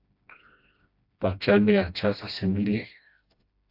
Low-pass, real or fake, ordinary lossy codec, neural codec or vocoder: 5.4 kHz; fake; AAC, 32 kbps; codec, 16 kHz, 1 kbps, FreqCodec, smaller model